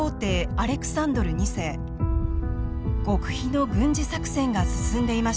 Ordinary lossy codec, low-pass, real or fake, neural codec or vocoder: none; none; real; none